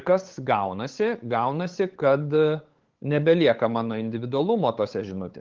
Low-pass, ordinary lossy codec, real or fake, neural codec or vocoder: 7.2 kHz; Opus, 16 kbps; fake; codec, 16 kHz, 8 kbps, FunCodec, trained on LibriTTS, 25 frames a second